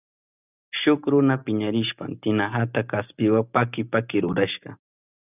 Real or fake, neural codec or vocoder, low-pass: real; none; 3.6 kHz